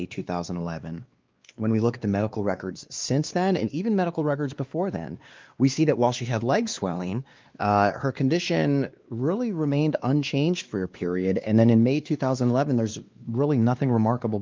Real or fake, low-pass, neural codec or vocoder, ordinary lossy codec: fake; 7.2 kHz; codec, 16 kHz, 2 kbps, X-Codec, WavLM features, trained on Multilingual LibriSpeech; Opus, 24 kbps